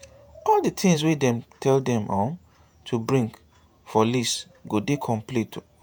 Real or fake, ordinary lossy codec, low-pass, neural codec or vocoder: fake; none; none; vocoder, 48 kHz, 128 mel bands, Vocos